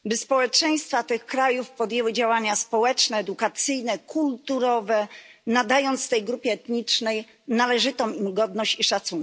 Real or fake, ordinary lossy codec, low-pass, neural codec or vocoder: real; none; none; none